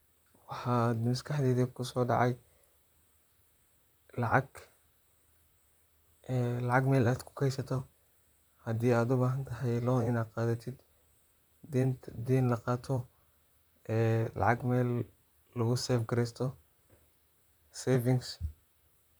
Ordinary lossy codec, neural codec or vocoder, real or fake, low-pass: none; vocoder, 44.1 kHz, 128 mel bands, Pupu-Vocoder; fake; none